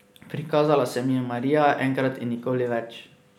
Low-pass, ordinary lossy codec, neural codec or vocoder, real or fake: 19.8 kHz; none; none; real